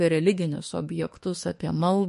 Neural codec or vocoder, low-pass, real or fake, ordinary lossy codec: autoencoder, 48 kHz, 32 numbers a frame, DAC-VAE, trained on Japanese speech; 14.4 kHz; fake; MP3, 48 kbps